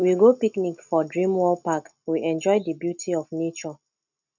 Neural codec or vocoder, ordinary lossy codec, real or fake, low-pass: none; none; real; 7.2 kHz